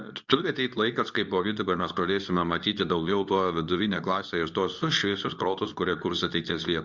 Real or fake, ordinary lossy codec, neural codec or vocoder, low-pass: fake; Opus, 64 kbps; codec, 24 kHz, 0.9 kbps, WavTokenizer, medium speech release version 2; 7.2 kHz